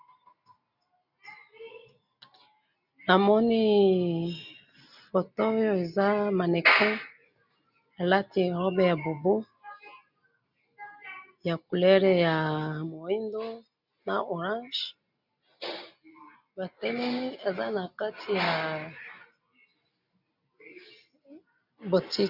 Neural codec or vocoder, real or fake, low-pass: none; real; 5.4 kHz